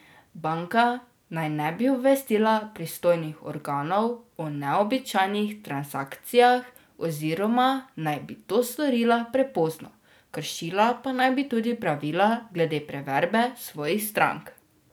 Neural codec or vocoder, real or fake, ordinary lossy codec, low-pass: none; real; none; none